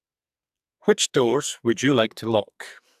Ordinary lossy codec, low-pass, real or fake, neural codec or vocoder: AAC, 96 kbps; 14.4 kHz; fake; codec, 44.1 kHz, 2.6 kbps, SNAC